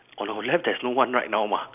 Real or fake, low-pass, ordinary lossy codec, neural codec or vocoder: real; 3.6 kHz; none; none